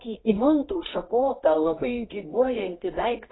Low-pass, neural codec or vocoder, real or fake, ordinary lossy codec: 7.2 kHz; codec, 24 kHz, 0.9 kbps, WavTokenizer, medium music audio release; fake; AAC, 16 kbps